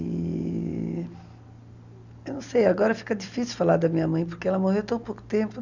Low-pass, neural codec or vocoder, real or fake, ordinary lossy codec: 7.2 kHz; none; real; none